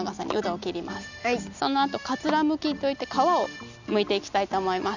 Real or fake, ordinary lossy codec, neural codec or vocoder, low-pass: real; none; none; 7.2 kHz